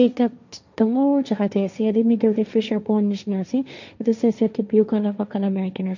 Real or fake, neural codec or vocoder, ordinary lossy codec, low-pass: fake; codec, 16 kHz, 1.1 kbps, Voila-Tokenizer; none; 7.2 kHz